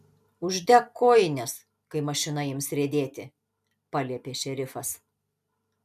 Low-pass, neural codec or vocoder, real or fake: 14.4 kHz; none; real